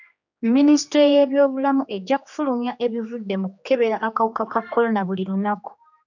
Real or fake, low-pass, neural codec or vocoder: fake; 7.2 kHz; codec, 16 kHz, 2 kbps, X-Codec, HuBERT features, trained on general audio